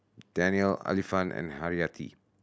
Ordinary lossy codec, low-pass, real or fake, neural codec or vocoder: none; none; real; none